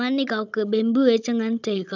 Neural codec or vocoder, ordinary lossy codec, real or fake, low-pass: codec, 16 kHz, 16 kbps, FunCodec, trained on Chinese and English, 50 frames a second; none; fake; 7.2 kHz